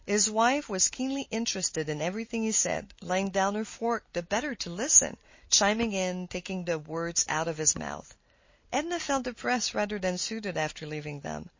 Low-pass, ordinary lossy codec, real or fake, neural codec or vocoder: 7.2 kHz; MP3, 32 kbps; real; none